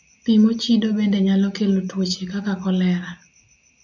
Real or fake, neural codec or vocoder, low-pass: real; none; 7.2 kHz